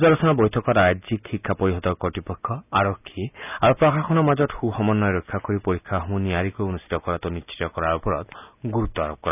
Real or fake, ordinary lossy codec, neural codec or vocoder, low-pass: real; none; none; 3.6 kHz